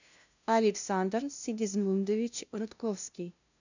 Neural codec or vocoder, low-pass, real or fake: codec, 16 kHz, 0.5 kbps, FunCodec, trained on LibriTTS, 25 frames a second; 7.2 kHz; fake